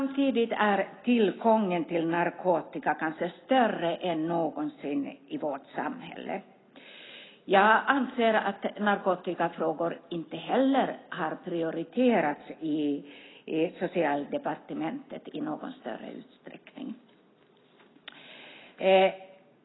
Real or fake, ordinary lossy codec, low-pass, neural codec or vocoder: real; AAC, 16 kbps; 7.2 kHz; none